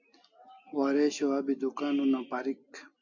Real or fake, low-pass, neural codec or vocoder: real; 7.2 kHz; none